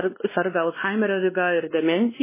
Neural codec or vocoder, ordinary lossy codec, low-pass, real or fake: codec, 16 kHz, 2 kbps, X-Codec, WavLM features, trained on Multilingual LibriSpeech; MP3, 16 kbps; 3.6 kHz; fake